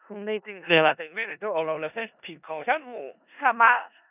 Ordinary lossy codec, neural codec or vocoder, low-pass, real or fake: none; codec, 16 kHz in and 24 kHz out, 0.4 kbps, LongCat-Audio-Codec, four codebook decoder; 3.6 kHz; fake